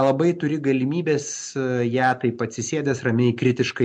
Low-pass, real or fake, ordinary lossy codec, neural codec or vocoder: 10.8 kHz; real; MP3, 64 kbps; none